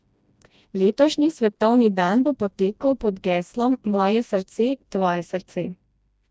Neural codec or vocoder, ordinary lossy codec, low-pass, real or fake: codec, 16 kHz, 1 kbps, FreqCodec, smaller model; none; none; fake